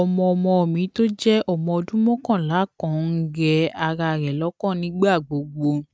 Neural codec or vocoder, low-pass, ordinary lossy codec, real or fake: none; none; none; real